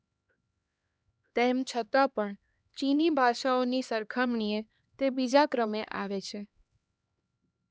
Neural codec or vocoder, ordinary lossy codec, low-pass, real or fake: codec, 16 kHz, 2 kbps, X-Codec, HuBERT features, trained on LibriSpeech; none; none; fake